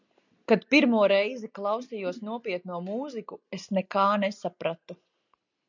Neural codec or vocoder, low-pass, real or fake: none; 7.2 kHz; real